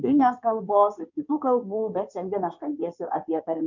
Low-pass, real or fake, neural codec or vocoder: 7.2 kHz; fake; codec, 16 kHz in and 24 kHz out, 2.2 kbps, FireRedTTS-2 codec